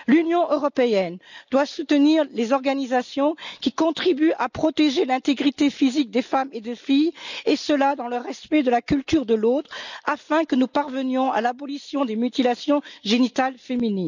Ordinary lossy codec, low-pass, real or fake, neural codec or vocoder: none; 7.2 kHz; real; none